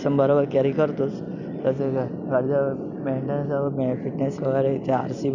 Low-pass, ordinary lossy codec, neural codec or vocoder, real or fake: 7.2 kHz; none; none; real